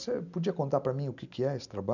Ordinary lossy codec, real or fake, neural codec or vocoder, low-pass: none; real; none; 7.2 kHz